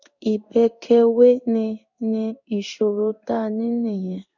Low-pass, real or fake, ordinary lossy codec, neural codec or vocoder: 7.2 kHz; fake; none; codec, 16 kHz in and 24 kHz out, 1 kbps, XY-Tokenizer